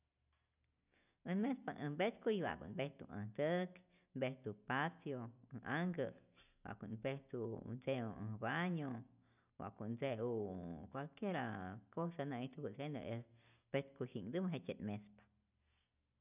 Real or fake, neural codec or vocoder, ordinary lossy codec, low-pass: real; none; none; 3.6 kHz